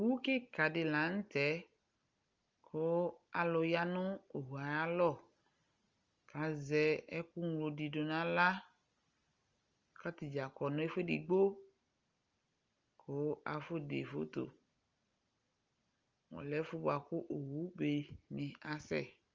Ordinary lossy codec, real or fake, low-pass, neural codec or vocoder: Opus, 32 kbps; real; 7.2 kHz; none